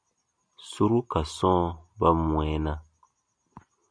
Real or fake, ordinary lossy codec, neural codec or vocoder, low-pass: real; MP3, 96 kbps; none; 9.9 kHz